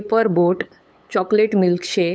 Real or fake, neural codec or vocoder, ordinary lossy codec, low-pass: fake; codec, 16 kHz, 8 kbps, FunCodec, trained on LibriTTS, 25 frames a second; none; none